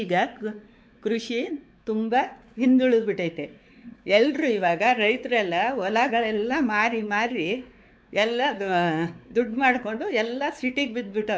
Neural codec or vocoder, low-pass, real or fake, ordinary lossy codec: codec, 16 kHz, 4 kbps, X-Codec, WavLM features, trained on Multilingual LibriSpeech; none; fake; none